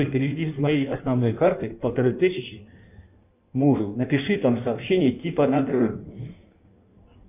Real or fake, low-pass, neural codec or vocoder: fake; 3.6 kHz; codec, 16 kHz in and 24 kHz out, 1.1 kbps, FireRedTTS-2 codec